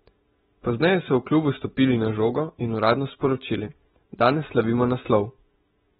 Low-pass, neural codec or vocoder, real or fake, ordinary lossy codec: 19.8 kHz; none; real; AAC, 16 kbps